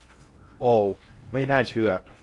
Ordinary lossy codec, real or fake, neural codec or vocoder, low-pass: AAC, 48 kbps; fake; codec, 16 kHz in and 24 kHz out, 0.8 kbps, FocalCodec, streaming, 65536 codes; 10.8 kHz